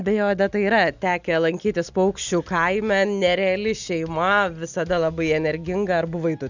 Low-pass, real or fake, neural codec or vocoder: 7.2 kHz; real; none